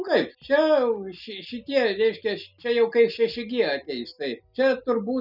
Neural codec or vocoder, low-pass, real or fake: none; 5.4 kHz; real